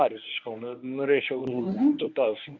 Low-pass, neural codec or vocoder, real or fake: 7.2 kHz; codec, 24 kHz, 0.9 kbps, WavTokenizer, medium speech release version 1; fake